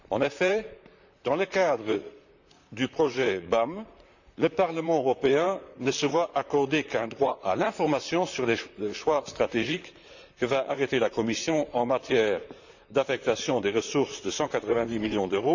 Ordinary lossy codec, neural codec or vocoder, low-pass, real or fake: none; vocoder, 44.1 kHz, 128 mel bands, Pupu-Vocoder; 7.2 kHz; fake